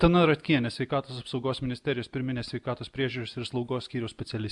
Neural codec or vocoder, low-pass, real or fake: none; 10.8 kHz; real